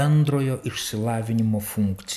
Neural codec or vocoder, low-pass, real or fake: none; 14.4 kHz; real